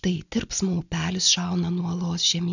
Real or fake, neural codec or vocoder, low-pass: real; none; 7.2 kHz